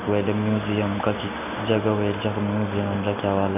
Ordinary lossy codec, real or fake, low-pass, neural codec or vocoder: none; real; 3.6 kHz; none